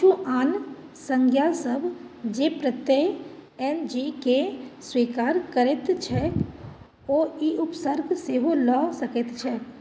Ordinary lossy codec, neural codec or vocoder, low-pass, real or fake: none; none; none; real